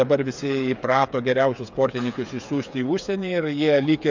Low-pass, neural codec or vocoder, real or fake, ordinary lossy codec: 7.2 kHz; codec, 16 kHz, 8 kbps, FreqCodec, smaller model; fake; MP3, 64 kbps